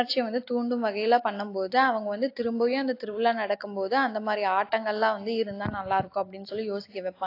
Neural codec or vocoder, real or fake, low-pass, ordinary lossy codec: none; real; 5.4 kHz; AAC, 32 kbps